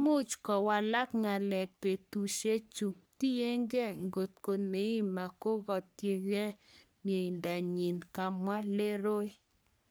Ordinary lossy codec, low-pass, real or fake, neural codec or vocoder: none; none; fake; codec, 44.1 kHz, 3.4 kbps, Pupu-Codec